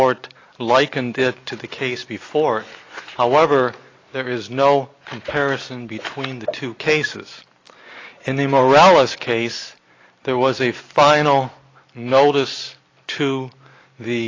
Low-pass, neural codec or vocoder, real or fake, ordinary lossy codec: 7.2 kHz; none; real; AAC, 32 kbps